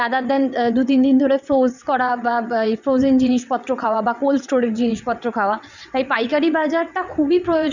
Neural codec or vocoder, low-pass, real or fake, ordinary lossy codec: vocoder, 22.05 kHz, 80 mel bands, WaveNeXt; 7.2 kHz; fake; none